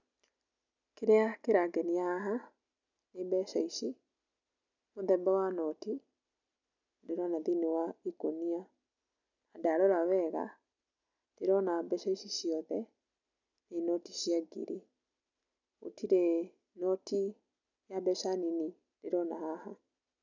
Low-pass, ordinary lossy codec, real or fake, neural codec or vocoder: 7.2 kHz; none; real; none